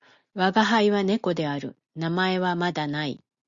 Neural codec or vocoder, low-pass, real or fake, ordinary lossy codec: none; 7.2 kHz; real; Opus, 64 kbps